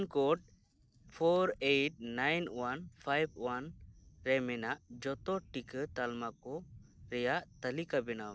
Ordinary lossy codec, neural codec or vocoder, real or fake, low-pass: none; none; real; none